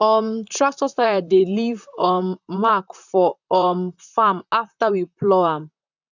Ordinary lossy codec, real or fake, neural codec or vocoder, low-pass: none; fake; vocoder, 44.1 kHz, 128 mel bands, Pupu-Vocoder; 7.2 kHz